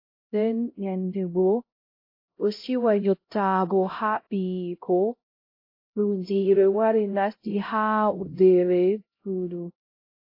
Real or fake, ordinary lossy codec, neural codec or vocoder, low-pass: fake; AAC, 32 kbps; codec, 16 kHz, 0.5 kbps, X-Codec, HuBERT features, trained on LibriSpeech; 5.4 kHz